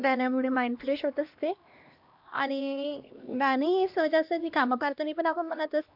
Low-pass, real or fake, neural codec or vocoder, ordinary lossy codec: 5.4 kHz; fake; codec, 16 kHz, 1 kbps, X-Codec, HuBERT features, trained on LibriSpeech; MP3, 48 kbps